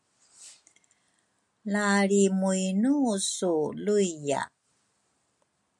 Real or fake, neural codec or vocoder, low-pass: real; none; 10.8 kHz